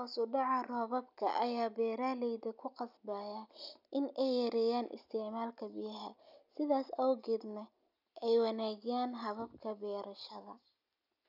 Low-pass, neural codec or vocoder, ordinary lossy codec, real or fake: 5.4 kHz; none; none; real